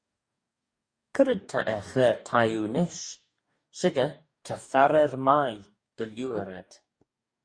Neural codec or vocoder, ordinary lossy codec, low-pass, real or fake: codec, 44.1 kHz, 2.6 kbps, DAC; Opus, 64 kbps; 9.9 kHz; fake